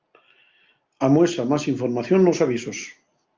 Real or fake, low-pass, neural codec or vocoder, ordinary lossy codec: real; 7.2 kHz; none; Opus, 32 kbps